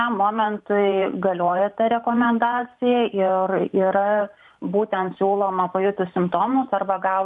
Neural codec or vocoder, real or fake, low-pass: vocoder, 44.1 kHz, 128 mel bands every 512 samples, BigVGAN v2; fake; 10.8 kHz